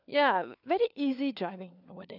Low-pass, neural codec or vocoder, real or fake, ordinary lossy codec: 5.4 kHz; codec, 16 kHz, 4 kbps, FunCodec, trained on LibriTTS, 50 frames a second; fake; none